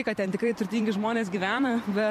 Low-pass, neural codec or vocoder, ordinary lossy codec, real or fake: 14.4 kHz; vocoder, 44.1 kHz, 128 mel bands every 256 samples, BigVGAN v2; MP3, 64 kbps; fake